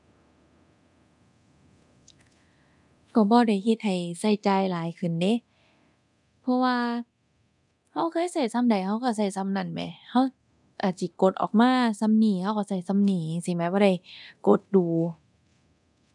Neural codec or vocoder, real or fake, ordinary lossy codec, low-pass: codec, 24 kHz, 0.9 kbps, DualCodec; fake; none; 10.8 kHz